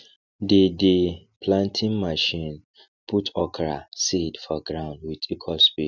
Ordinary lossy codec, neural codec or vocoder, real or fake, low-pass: none; none; real; 7.2 kHz